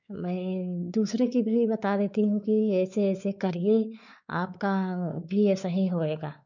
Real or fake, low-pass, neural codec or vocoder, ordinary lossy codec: fake; 7.2 kHz; codec, 16 kHz, 4 kbps, X-Codec, WavLM features, trained on Multilingual LibriSpeech; none